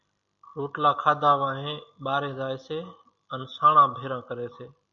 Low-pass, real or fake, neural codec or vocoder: 7.2 kHz; real; none